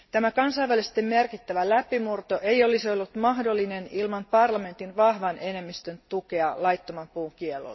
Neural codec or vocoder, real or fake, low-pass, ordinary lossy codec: none; real; 7.2 kHz; MP3, 24 kbps